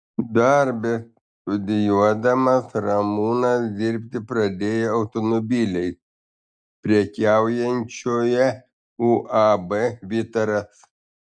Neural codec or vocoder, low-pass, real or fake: none; 9.9 kHz; real